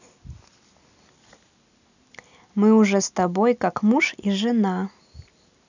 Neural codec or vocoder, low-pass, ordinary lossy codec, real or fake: none; 7.2 kHz; none; real